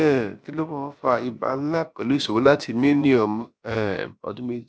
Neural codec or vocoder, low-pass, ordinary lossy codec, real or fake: codec, 16 kHz, about 1 kbps, DyCAST, with the encoder's durations; none; none; fake